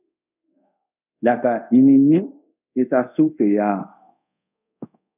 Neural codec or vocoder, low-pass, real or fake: codec, 24 kHz, 0.5 kbps, DualCodec; 3.6 kHz; fake